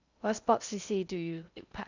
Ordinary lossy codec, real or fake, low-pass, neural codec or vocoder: none; fake; 7.2 kHz; codec, 16 kHz in and 24 kHz out, 0.6 kbps, FocalCodec, streaming, 2048 codes